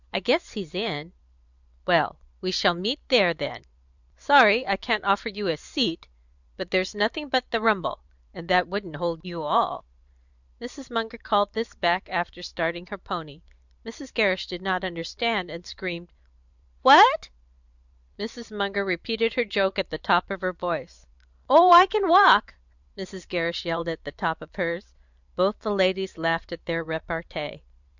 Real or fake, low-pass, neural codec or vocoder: fake; 7.2 kHz; vocoder, 44.1 kHz, 128 mel bands every 512 samples, BigVGAN v2